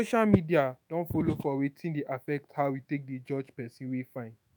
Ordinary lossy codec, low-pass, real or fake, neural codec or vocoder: none; none; fake; autoencoder, 48 kHz, 128 numbers a frame, DAC-VAE, trained on Japanese speech